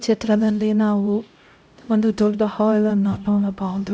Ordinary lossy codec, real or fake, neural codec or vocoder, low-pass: none; fake; codec, 16 kHz, 0.5 kbps, X-Codec, HuBERT features, trained on LibriSpeech; none